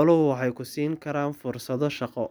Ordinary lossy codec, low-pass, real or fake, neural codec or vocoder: none; none; real; none